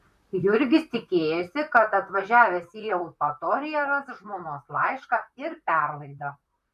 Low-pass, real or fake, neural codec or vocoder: 14.4 kHz; fake; vocoder, 44.1 kHz, 128 mel bands, Pupu-Vocoder